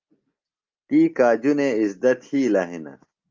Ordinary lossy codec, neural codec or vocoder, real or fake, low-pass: Opus, 24 kbps; none; real; 7.2 kHz